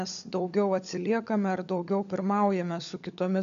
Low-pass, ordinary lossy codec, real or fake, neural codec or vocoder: 7.2 kHz; AAC, 64 kbps; fake; codec, 16 kHz, 16 kbps, FunCodec, trained on LibriTTS, 50 frames a second